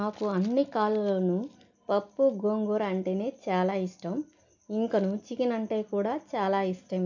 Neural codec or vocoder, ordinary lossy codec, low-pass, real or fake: none; none; 7.2 kHz; real